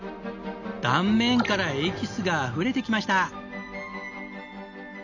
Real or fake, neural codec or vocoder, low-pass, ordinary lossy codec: real; none; 7.2 kHz; none